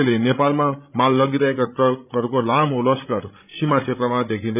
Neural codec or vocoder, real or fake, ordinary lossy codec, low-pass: codec, 16 kHz, 16 kbps, FreqCodec, larger model; fake; none; 3.6 kHz